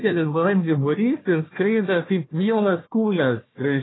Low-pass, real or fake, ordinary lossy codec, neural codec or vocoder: 7.2 kHz; fake; AAC, 16 kbps; codec, 16 kHz, 1 kbps, FunCodec, trained on Chinese and English, 50 frames a second